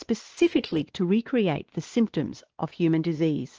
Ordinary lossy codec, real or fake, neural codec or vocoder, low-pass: Opus, 16 kbps; fake; codec, 16 kHz, 2 kbps, X-Codec, WavLM features, trained on Multilingual LibriSpeech; 7.2 kHz